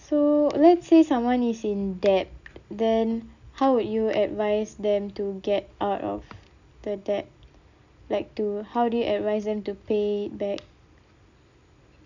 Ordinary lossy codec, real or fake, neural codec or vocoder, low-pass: none; real; none; 7.2 kHz